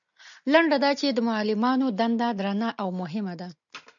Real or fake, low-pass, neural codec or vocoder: real; 7.2 kHz; none